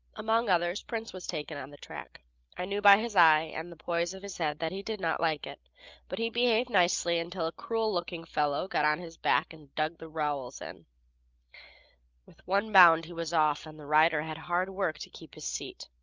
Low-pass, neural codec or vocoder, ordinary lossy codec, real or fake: 7.2 kHz; none; Opus, 24 kbps; real